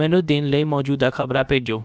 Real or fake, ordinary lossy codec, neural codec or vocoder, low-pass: fake; none; codec, 16 kHz, about 1 kbps, DyCAST, with the encoder's durations; none